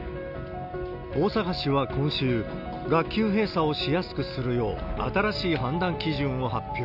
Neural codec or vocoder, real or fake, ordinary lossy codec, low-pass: none; real; none; 5.4 kHz